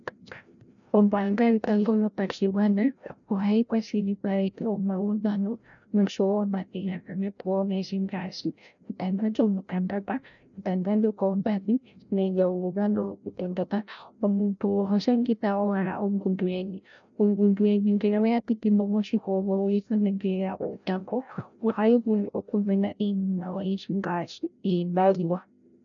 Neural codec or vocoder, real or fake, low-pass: codec, 16 kHz, 0.5 kbps, FreqCodec, larger model; fake; 7.2 kHz